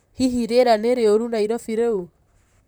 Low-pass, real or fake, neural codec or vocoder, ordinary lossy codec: none; fake; vocoder, 44.1 kHz, 128 mel bands, Pupu-Vocoder; none